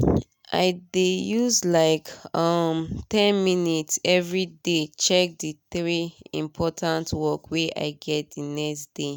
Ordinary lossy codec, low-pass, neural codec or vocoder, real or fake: none; none; none; real